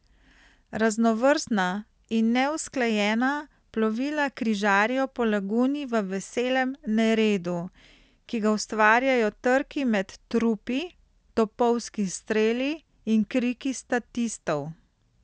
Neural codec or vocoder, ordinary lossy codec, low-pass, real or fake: none; none; none; real